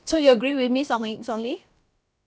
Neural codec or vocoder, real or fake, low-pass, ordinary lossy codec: codec, 16 kHz, about 1 kbps, DyCAST, with the encoder's durations; fake; none; none